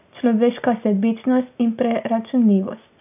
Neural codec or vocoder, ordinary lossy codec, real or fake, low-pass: none; none; real; 3.6 kHz